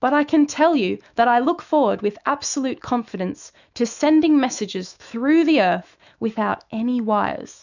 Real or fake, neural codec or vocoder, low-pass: fake; autoencoder, 48 kHz, 128 numbers a frame, DAC-VAE, trained on Japanese speech; 7.2 kHz